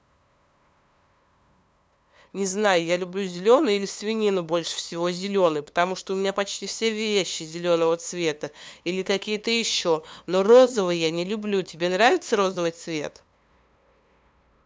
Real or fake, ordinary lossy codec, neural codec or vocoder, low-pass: fake; none; codec, 16 kHz, 2 kbps, FunCodec, trained on LibriTTS, 25 frames a second; none